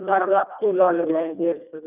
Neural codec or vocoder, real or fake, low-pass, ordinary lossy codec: codec, 24 kHz, 1.5 kbps, HILCodec; fake; 3.6 kHz; none